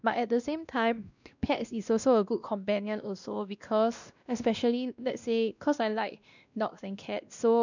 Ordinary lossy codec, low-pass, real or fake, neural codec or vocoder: none; 7.2 kHz; fake; codec, 16 kHz, 1 kbps, X-Codec, WavLM features, trained on Multilingual LibriSpeech